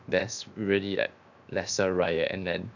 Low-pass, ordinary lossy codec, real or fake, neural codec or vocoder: 7.2 kHz; none; fake; codec, 16 kHz, 0.7 kbps, FocalCodec